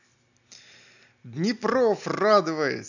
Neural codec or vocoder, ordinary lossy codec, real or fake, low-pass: none; none; real; 7.2 kHz